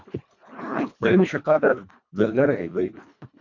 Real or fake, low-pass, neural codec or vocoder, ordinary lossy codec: fake; 7.2 kHz; codec, 24 kHz, 1.5 kbps, HILCodec; MP3, 64 kbps